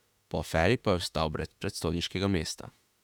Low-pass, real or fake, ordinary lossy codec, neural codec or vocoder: 19.8 kHz; fake; none; autoencoder, 48 kHz, 32 numbers a frame, DAC-VAE, trained on Japanese speech